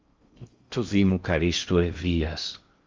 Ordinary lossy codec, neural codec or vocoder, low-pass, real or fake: Opus, 32 kbps; codec, 16 kHz in and 24 kHz out, 0.6 kbps, FocalCodec, streaming, 4096 codes; 7.2 kHz; fake